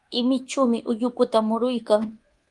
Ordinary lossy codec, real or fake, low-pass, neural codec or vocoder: Opus, 24 kbps; fake; 10.8 kHz; codec, 24 kHz, 1.2 kbps, DualCodec